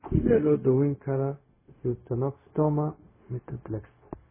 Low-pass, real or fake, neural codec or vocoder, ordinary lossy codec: 3.6 kHz; fake; codec, 16 kHz, 0.4 kbps, LongCat-Audio-Codec; MP3, 16 kbps